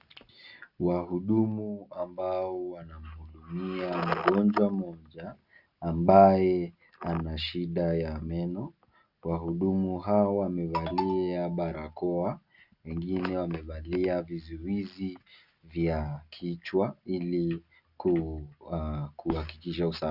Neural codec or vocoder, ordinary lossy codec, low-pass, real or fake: none; Opus, 64 kbps; 5.4 kHz; real